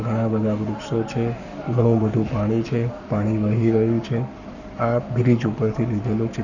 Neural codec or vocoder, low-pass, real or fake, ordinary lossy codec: codec, 44.1 kHz, 7.8 kbps, Pupu-Codec; 7.2 kHz; fake; none